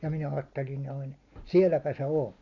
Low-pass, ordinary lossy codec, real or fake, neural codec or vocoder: 7.2 kHz; none; real; none